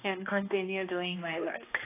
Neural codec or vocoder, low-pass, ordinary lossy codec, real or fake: codec, 16 kHz, 1 kbps, X-Codec, HuBERT features, trained on balanced general audio; 3.6 kHz; AAC, 24 kbps; fake